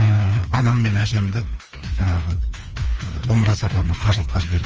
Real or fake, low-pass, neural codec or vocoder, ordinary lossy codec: fake; 7.2 kHz; codec, 16 kHz, 2 kbps, FreqCodec, larger model; Opus, 24 kbps